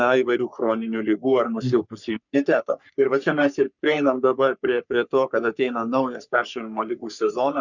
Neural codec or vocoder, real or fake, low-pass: codec, 44.1 kHz, 3.4 kbps, Pupu-Codec; fake; 7.2 kHz